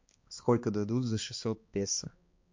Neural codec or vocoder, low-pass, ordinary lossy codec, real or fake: codec, 16 kHz, 2 kbps, X-Codec, HuBERT features, trained on balanced general audio; 7.2 kHz; MP3, 48 kbps; fake